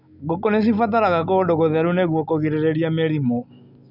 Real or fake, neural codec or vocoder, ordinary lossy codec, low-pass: real; none; none; 5.4 kHz